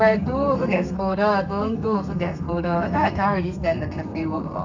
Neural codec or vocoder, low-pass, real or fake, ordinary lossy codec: codec, 32 kHz, 1.9 kbps, SNAC; 7.2 kHz; fake; none